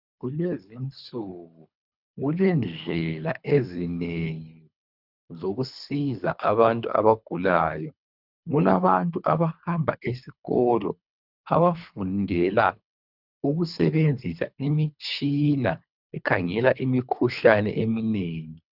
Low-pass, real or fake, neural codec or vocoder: 5.4 kHz; fake; codec, 24 kHz, 3 kbps, HILCodec